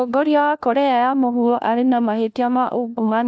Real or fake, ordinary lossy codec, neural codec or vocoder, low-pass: fake; none; codec, 16 kHz, 1 kbps, FunCodec, trained on LibriTTS, 50 frames a second; none